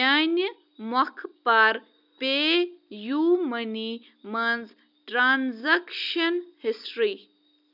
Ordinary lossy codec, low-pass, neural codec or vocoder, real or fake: none; 5.4 kHz; none; real